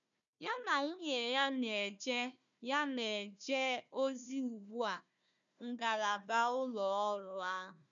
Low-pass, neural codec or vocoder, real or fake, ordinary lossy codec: 7.2 kHz; codec, 16 kHz, 1 kbps, FunCodec, trained on Chinese and English, 50 frames a second; fake; none